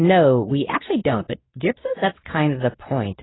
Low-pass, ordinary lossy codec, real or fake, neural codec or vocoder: 7.2 kHz; AAC, 16 kbps; fake; codec, 24 kHz, 0.9 kbps, WavTokenizer, medium speech release version 2